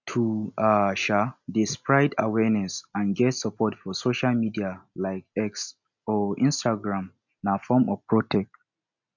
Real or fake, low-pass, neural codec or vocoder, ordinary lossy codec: real; 7.2 kHz; none; none